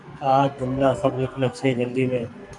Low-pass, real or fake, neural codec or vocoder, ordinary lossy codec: 10.8 kHz; fake; codec, 44.1 kHz, 2.6 kbps, SNAC; MP3, 96 kbps